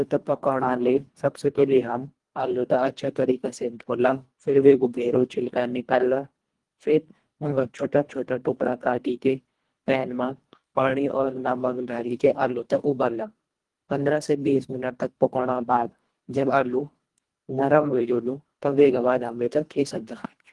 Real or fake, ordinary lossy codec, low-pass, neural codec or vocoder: fake; Opus, 24 kbps; 10.8 kHz; codec, 24 kHz, 1.5 kbps, HILCodec